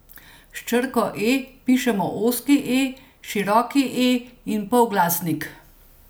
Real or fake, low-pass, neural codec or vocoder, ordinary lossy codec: real; none; none; none